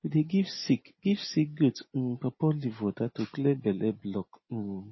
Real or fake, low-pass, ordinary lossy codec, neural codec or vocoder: real; 7.2 kHz; MP3, 24 kbps; none